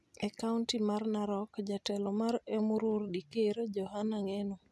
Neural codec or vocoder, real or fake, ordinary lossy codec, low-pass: none; real; none; none